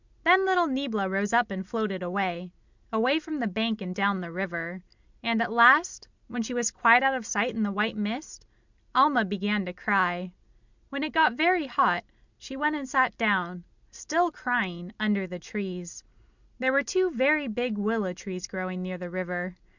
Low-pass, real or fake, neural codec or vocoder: 7.2 kHz; real; none